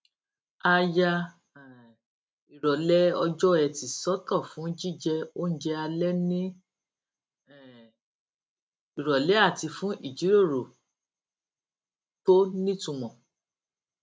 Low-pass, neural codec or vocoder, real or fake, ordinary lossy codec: none; none; real; none